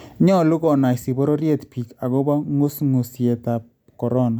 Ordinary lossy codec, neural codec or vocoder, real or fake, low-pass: none; none; real; 19.8 kHz